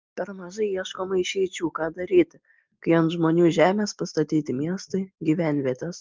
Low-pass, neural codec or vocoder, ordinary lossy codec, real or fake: 7.2 kHz; none; Opus, 32 kbps; real